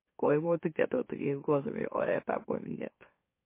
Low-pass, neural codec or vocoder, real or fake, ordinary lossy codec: 3.6 kHz; autoencoder, 44.1 kHz, a latent of 192 numbers a frame, MeloTTS; fake; MP3, 24 kbps